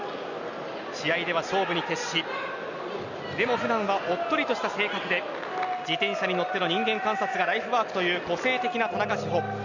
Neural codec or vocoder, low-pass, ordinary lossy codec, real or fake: none; 7.2 kHz; none; real